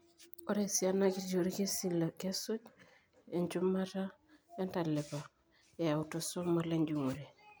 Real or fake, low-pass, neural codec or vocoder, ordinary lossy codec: real; none; none; none